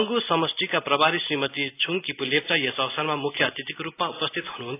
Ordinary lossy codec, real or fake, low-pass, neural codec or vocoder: AAC, 24 kbps; real; 3.6 kHz; none